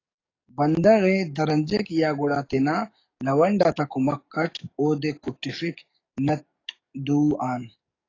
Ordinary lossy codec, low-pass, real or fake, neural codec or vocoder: AAC, 32 kbps; 7.2 kHz; fake; codec, 44.1 kHz, 7.8 kbps, DAC